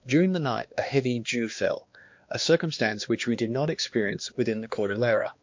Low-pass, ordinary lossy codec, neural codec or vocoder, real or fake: 7.2 kHz; MP3, 48 kbps; codec, 16 kHz, 2 kbps, X-Codec, HuBERT features, trained on balanced general audio; fake